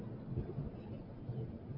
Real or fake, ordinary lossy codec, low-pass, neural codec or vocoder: real; AAC, 48 kbps; 5.4 kHz; none